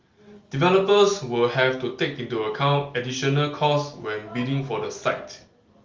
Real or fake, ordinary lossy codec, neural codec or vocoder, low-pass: real; Opus, 32 kbps; none; 7.2 kHz